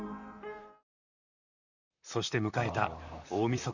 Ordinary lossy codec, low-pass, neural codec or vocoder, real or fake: none; 7.2 kHz; vocoder, 44.1 kHz, 128 mel bands, Pupu-Vocoder; fake